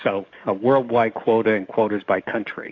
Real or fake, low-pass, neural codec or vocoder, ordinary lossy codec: fake; 7.2 kHz; codec, 44.1 kHz, 7.8 kbps, DAC; AAC, 48 kbps